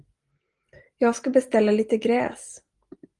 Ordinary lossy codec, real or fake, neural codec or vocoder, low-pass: Opus, 24 kbps; real; none; 10.8 kHz